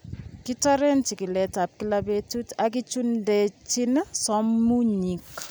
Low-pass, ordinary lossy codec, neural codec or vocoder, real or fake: none; none; none; real